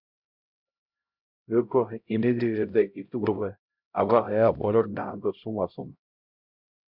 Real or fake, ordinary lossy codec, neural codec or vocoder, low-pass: fake; MP3, 48 kbps; codec, 16 kHz, 0.5 kbps, X-Codec, HuBERT features, trained on LibriSpeech; 5.4 kHz